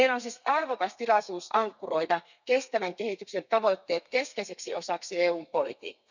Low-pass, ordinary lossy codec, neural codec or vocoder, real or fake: 7.2 kHz; none; codec, 32 kHz, 1.9 kbps, SNAC; fake